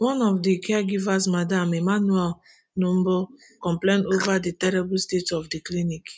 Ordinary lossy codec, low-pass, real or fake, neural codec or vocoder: none; none; real; none